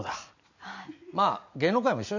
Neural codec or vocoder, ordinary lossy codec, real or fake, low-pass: none; none; real; 7.2 kHz